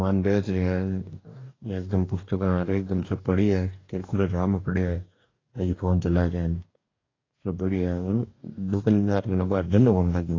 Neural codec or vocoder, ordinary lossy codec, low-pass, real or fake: codec, 44.1 kHz, 2.6 kbps, DAC; AAC, 32 kbps; 7.2 kHz; fake